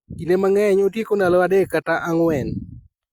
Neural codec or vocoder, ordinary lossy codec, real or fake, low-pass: none; Opus, 64 kbps; real; 19.8 kHz